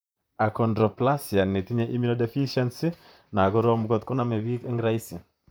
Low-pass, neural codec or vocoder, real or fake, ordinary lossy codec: none; vocoder, 44.1 kHz, 128 mel bands, Pupu-Vocoder; fake; none